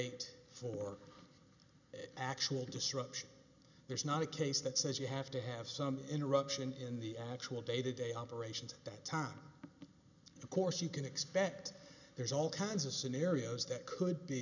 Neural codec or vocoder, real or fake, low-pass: none; real; 7.2 kHz